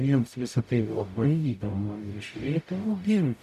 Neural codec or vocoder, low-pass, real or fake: codec, 44.1 kHz, 0.9 kbps, DAC; 14.4 kHz; fake